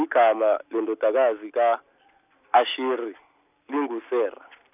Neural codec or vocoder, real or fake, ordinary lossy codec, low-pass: none; real; none; 3.6 kHz